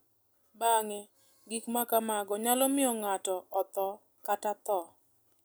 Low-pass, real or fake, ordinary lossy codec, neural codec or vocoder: none; real; none; none